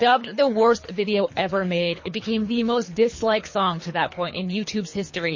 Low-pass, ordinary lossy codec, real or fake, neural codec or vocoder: 7.2 kHz; MP3, 32 kbps; fake; codec, 24 kHz, 3 kbps, HILCodec